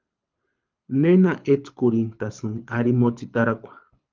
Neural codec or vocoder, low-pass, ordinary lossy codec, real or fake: codec, 24 kHz, 6 kbps, HILCodec; 7.2 kHz; Opus, 24 kbps; fake